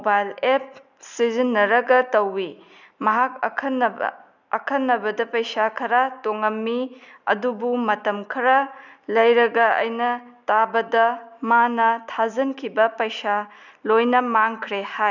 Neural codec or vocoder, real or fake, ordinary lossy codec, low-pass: none; real; none; 7.2 kHz